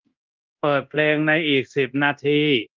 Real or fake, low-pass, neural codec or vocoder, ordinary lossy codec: fake; 7.2 kHz; codec, 24 kHz, 0.9 kbps, DualCodec; Opus, 32 kbps